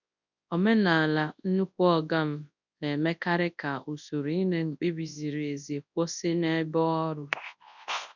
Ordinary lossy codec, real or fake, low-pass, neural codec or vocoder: none; fake; 7.2 kHz; codec, 24 kHz, 0.9 kbps, WavTokenizer, large speech release